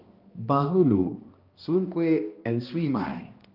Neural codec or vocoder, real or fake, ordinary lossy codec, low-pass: codec, 16 kHz, 1 kbps, X-Codec, HuBERT features, trained on balanced general audio; fake; Opus, 32 kbps; 5.4 kHz